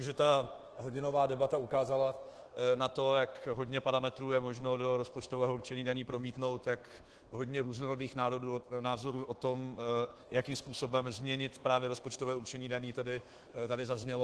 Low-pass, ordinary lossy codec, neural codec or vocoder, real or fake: 10.8 kHz; Opus, 16 kbps; codec, 24 kHz, 1.2 kbps, DualCodec; fake